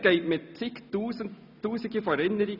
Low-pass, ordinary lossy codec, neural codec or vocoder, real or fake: 5.4 kHz; none; none; real